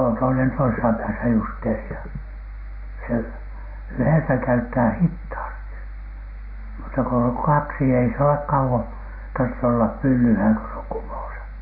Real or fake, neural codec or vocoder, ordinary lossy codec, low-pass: real; none; MP3, 24 kbps; 5.4 kHz